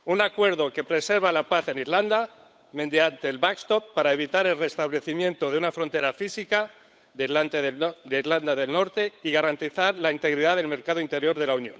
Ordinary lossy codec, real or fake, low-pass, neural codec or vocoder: none; fake; none; codec, 16 kHz, 8 kbps, FunCodec, trained on Chinese and English, 25 frames a second